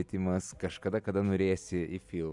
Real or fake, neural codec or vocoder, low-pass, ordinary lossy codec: real; none; 10.8 kHz; MP3, 96 kbps